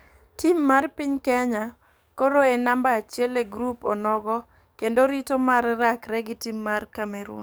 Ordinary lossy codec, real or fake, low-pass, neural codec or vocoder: none; fake; none; codec, 44.1 kHz, 7.8 kbps, DAC